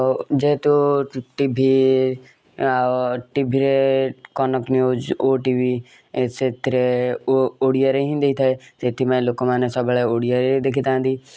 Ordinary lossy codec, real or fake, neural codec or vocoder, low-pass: none; real; none; none